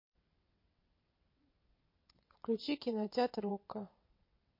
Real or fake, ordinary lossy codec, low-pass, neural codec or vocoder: fake; MP3, 32 kbps; 5.4 kHz; vocoder, 22.05 kHz, 80 mel bands, WaveNeXt